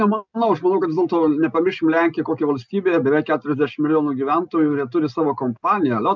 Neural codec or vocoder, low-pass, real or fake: none; 7.2 kHz; real